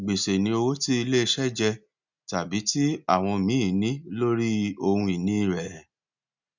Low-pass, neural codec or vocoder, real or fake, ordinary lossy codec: 7.2 kHz; none; real; none